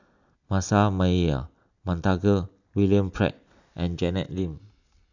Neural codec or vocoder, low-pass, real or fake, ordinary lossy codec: none; 7.2 kHz; real; none